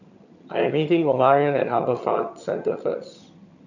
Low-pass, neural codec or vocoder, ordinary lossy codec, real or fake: 7.2 kHz; vocoder, 22.05 kHz, 80 mel bands, HiFi-GAN; none; fake